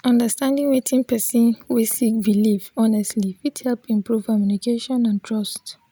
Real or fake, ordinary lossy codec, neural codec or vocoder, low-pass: real; none; none; none